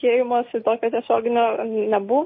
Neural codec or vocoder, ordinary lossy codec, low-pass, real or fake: none; MP3, 24 kbps; 7.2 kHz; real